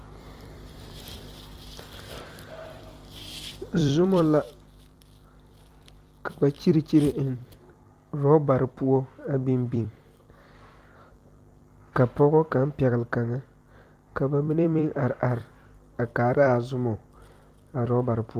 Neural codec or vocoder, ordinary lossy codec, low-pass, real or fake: vocoder, 44.1 kHz, 128 mel bands every 256 samples, BigVGAN v2; Opus, 32 kbps; 14.4 kHz; fake